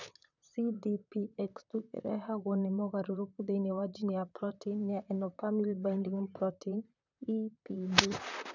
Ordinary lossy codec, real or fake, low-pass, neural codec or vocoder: none; fake; 7.2 kHz; vocoder, 44.1 kHz, 128 mel bands, Pupu-Vocoder